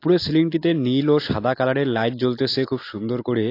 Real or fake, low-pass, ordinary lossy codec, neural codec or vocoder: real; 5.4 kHz; AAC, 32 kbps; none